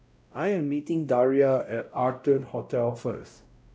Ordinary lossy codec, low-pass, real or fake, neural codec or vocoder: none; none; fake; codec, 16 kHz, 0.5 kbps, X-Codec, WavLM features, trained on Multilingual LibriSpeech